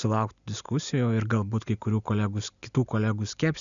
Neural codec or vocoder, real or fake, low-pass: none; real; 7.2 kHz